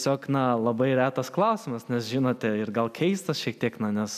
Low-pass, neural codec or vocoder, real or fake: 14.4 kHz; none; real